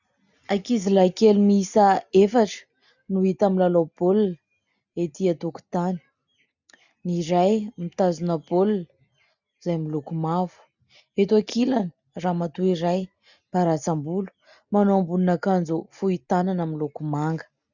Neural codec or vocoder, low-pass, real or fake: none; 7.2 kHz; real